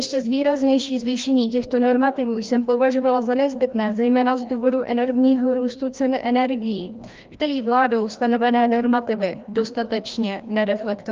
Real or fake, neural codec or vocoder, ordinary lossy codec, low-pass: fake; codec, 16 kHz, 1 kbps, FreqCodec, larger model; Opus, 24 kbps; 7.2 kHz